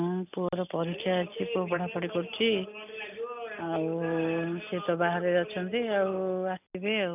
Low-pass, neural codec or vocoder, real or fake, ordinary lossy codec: 3.6 kHz; none; real; none